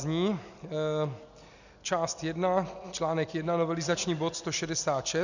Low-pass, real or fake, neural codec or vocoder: 7.2 kHz; real; none